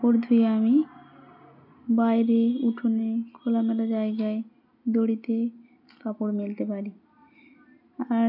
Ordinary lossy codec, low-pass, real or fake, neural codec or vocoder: none; 5.4 kHz; real; none